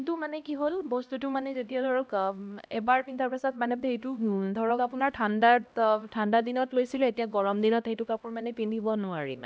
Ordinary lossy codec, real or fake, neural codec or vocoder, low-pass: none; fake; codec, 16 kHz, 1 kbps, X-Codec, HuBERT features, trained on LibriSpeech; none